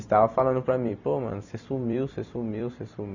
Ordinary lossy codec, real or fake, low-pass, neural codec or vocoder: none; fake; 7.2 kHz; vocoder, 44.1 kHz, 128 mel bands every 256 samples, BigVGAN v2